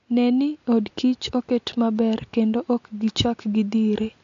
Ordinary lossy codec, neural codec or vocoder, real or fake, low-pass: AAC, 48 kbps; none; real; 7.2 kHz